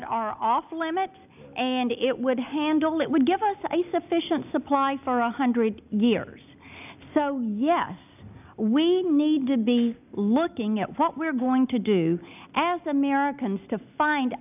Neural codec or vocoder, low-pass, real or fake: none; 3.6 kHz; real